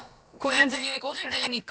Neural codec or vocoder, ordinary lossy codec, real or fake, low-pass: codec, 16 kHz, about 1 kbps, DyCAST, with the encoder's durations; none; fake; none